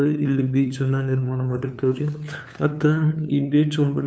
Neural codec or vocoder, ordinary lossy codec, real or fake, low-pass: codec, 16 kHz, 2 kbps, FunCodec, trained on LibriTTS, 25 frames a second; none; fake; none